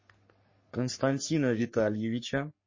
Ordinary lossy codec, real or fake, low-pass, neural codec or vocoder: MP3, 32 kbps; fake; 7.2 kHz; codec, 44.1 kHz, 3.4 kbps, Pupu-Codec